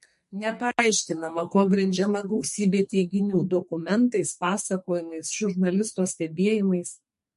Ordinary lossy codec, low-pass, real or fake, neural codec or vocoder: MP3, 48 kbps; 14.4 kHz; fake; codec, 44.1 kHz, 2.6 kbps, SNAC